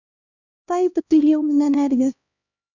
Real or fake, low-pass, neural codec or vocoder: fake; 7.2 kHz; codec, 16 kHz, 1 kbps, X-Codec, HuBERT features, trained on LibriSpeech